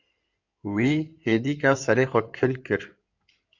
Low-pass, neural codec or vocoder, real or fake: 7.2 kHz; codec, 16 kHz in and 24 kHz out, 2.2 kbps, FireRedTTS-2 codec; fake